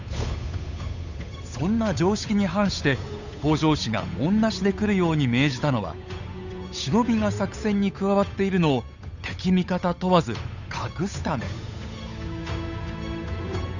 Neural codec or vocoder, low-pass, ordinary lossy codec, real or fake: codec, 16 kHz, 8 kbps, FunCodec, trained on Chinese and English, 25 frames a second; 7.2 kHz; none; fake